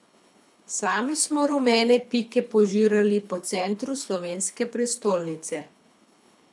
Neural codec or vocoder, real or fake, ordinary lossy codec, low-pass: codec, 24 kHz, 3 kbps, HILCodec; fake; none; none